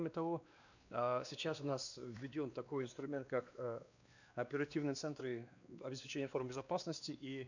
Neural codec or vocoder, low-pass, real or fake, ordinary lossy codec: codec, 16 kHz, 2 kbps, X-Codec, WavLM features, trained on Multilingual LibriSpeech; 7.2 kHz; fake; none